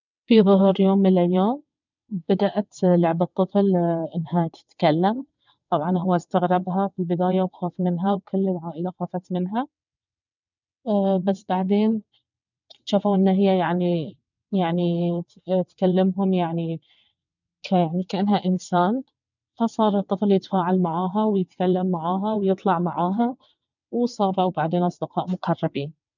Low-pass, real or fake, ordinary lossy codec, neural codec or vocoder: 7.2 kHz; fake; none; vocoder, 22.05 kHz, 80 mel bands, WaveNeXt